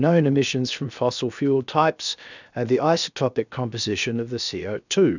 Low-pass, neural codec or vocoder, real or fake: 7.2 kHz; codec, 16 kHz, 0.7 kbps, FocalCodec; fake